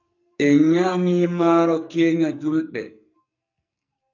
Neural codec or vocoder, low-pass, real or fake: codec, 44.1 kHz, 2.6 kbps, SNAC; 7.2 kHz; fake